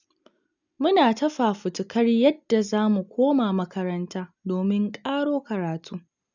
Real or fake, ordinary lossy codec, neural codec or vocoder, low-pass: real; none; none; 7.2 kHz